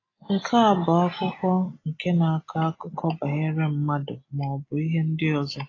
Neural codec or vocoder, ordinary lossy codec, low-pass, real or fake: none; AAC, 32 kbps; 7.2 kHz; real